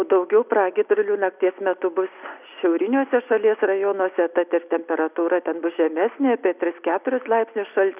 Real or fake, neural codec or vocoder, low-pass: real; none; 3.6 kHz